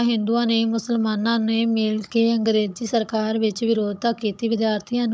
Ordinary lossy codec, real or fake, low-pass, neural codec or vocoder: none; fake; none; codec, 16 kHz, 16 kbps, FunCodec, trained on Chinese and English, 50 frames a second